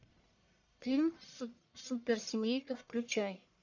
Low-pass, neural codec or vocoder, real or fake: 7.2 kHz; codec, 44.1 kHz, 1.7 kbps, Pupu-Codec; fake